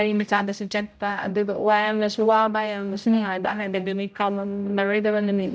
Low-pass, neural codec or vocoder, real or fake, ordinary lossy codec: none; codec, 16 kHz, 0.5 kbps, X-Codec, HuBERT features, trained on general audio; fake; none